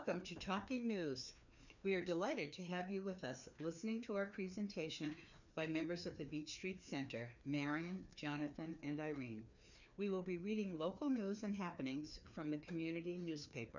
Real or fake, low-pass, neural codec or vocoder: fake; 7.2 kHz; codec, 16 kHz, 2 kbps, FreqCodec, larger model